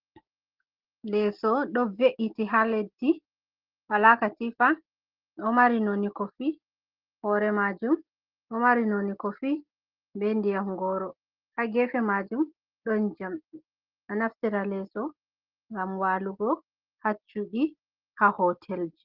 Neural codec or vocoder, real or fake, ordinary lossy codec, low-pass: none; real; Opus, 16 kbps; 5.4 kHz